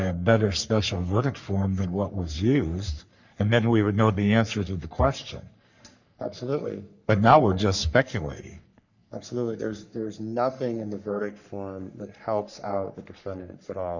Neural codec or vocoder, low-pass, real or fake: codec, 44.1 kHz, 3.4 kbps, Pupu-Codec; 7.2 kHz; fake